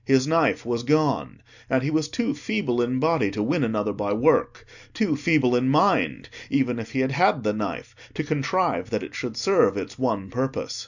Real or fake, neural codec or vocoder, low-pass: real; none; 7.2 kHz